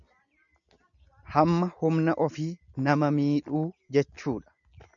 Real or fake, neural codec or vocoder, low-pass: real; none; 7.2 kHz